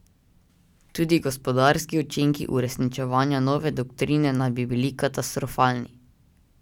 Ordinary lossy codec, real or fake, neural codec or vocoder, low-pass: none; real; none; 19.8 kHz